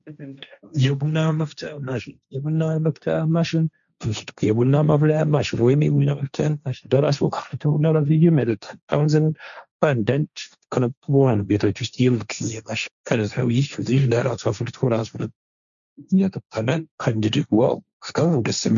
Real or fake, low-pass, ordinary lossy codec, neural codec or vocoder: fake; 7.2 kHz; MP3, 96 kbps; codec, 16 kHz, 1.1 kbps, Voila-Tokenizer